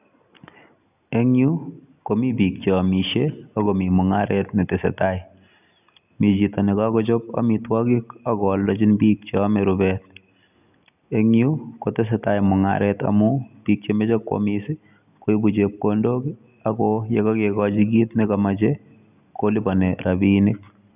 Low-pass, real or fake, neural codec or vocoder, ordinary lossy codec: 3.6 kHz; real; none; none